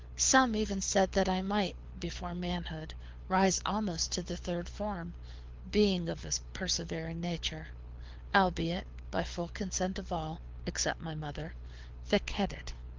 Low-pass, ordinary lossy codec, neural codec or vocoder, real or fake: 7.2 kHz; Opus, 32 kbps; codec, 24 kHz, 6 kbps, HILCodec; fake